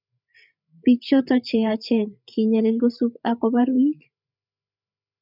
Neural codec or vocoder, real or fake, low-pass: codec, 16 kHz, 8 kbps, FreqCodec, larger model; fake; 5.4 kHz